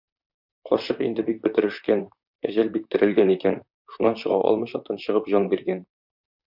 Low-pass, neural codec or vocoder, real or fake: 5.4 kHz; vocoder, 44.1 kHz, 128 mel bands, Pupu-Vocoder; fake